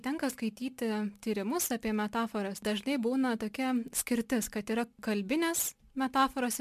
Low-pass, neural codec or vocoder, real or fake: 14.4 kHz; none; real